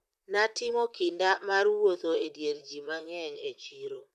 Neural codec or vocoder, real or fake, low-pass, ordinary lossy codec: vocoder, 44.1 kHz, 128 mel bands, Pupu-Vocoder; fake; 14.4 kHz; none